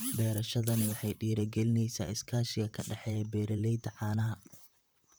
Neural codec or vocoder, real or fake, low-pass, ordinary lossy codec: vocoder, 44.1 kHz, 128 mel bands, Pupu-Vocoder; fake; none; none